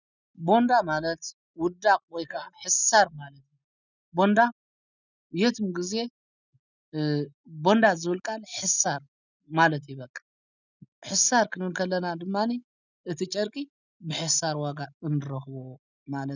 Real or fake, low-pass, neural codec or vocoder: real; 7.2 kHz; none